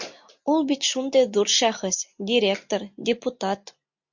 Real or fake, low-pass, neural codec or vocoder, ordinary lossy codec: real; 7.2 kHz; none; MP3, 48 kbps